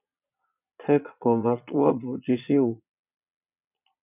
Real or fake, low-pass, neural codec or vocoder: fake; 3.6 kHz; vocoder, 22.05 kHz, 80 mel bands, Vocos